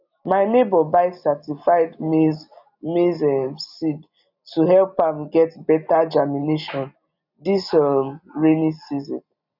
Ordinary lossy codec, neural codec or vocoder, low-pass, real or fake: none; none; 5.4 kHz; real